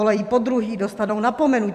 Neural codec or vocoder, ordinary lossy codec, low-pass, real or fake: vocoder, 44.1 kHz, 128 mel bands every 256 samples, BigVGAN v2; MP3, 96 kbps; 14.4 kHz; fake